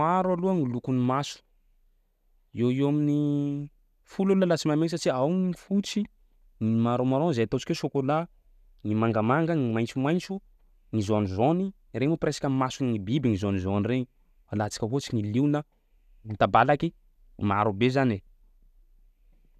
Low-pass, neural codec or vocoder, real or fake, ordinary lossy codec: 14.4 kHz; none; real; none